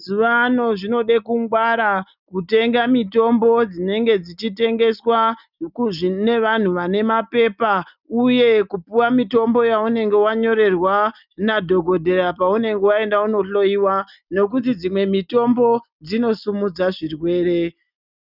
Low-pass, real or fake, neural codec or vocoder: 5.4 kHz; real; none